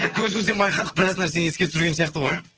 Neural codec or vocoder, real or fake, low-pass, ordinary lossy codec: vocoder, 22.05 kHz, 80 mel bands, HiFi-GAN; fake; 7.2 kHz; Opus, 16 kbps